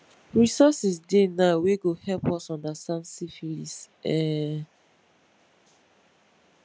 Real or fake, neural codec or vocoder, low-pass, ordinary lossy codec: real; none; none; none